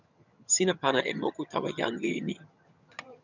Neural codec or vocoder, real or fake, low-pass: vocoder, 22.05 kHz, 80 mel bands, HiFi-GAN; fake; 7.2 kHz